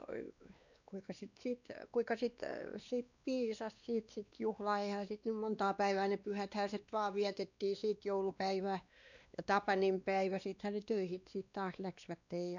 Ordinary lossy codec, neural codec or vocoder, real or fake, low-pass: none; codec, 16 kHz, 2 kbps, X-Codec, WavLM features, trained on Multilingual LibriSpeech; fake; 7.2 kHz